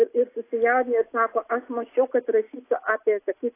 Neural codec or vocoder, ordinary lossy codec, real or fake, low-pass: none; AAC, 24 kbps; real; 3.6 kHz